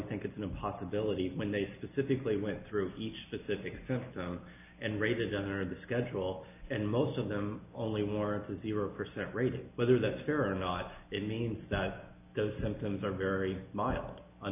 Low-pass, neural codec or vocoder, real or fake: 3.6 kHz; none; real